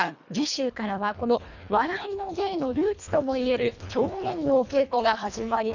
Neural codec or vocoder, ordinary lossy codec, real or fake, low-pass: codec, 24 kHz, 1.5 kbps, HILCodec; none; fake; 7.2 kHz